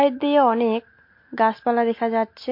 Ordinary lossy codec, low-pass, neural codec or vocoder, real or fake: MP3, 32 kbps; 5.4 kHz; none; real